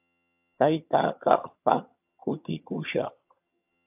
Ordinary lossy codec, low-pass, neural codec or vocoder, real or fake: AAC, 32 kbps; 3.6 kHz; vocoder, 22.05 kHz, 80 mel bands, HiFi-GAN; fake